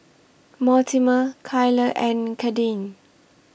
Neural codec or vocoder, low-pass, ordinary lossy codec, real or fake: none; none; none; real